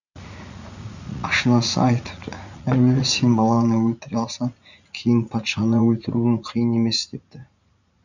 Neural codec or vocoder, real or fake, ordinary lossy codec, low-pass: vocoder, 44.1 kHz, 80 mel bands, Vocos; fake; none; 7.2 kHz